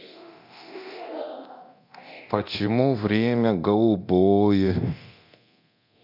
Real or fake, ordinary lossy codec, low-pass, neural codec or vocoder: fake; none; 5.4 kHz; codec, 24 kHz, 0.9 kbps, DualCodec